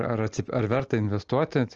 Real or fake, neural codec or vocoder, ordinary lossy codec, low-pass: real; none; Opus, 24 kbps; 7.2 kHz